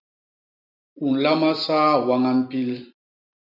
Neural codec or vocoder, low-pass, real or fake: vocoder, 44.1 kHz, 128 mel bands every 256 samples, BigVGAN v2; 5.4 kHz; fake